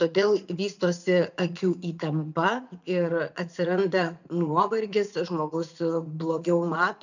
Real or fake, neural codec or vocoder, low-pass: fake; vocoder, 44.1 kHz, 80 mel bands, Vocos; 7.2 kHz